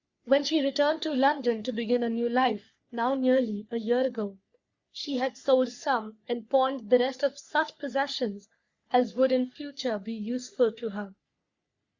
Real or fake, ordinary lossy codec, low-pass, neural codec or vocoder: fake; Opus, 64 kbps; 7.2 kHz; codec, 44.1 kHz, 3.4 kbps, Pupu-Codec